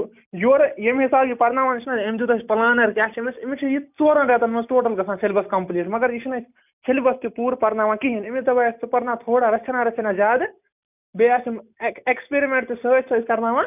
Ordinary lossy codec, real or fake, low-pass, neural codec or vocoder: none; real; 3.6 kHz; none